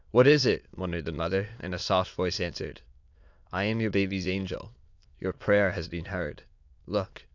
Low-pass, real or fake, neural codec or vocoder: 7.2 kHz; fake; autoencoder, 22.05 kHz, a latent of 192 numbers a frame, VITS, trained on many speakers